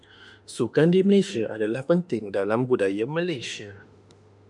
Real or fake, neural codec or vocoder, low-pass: fake; autoencoder, 48 kHz, 32 numbers a frame, DAC-VAE, trained on Japanese speech; 10.8 kHz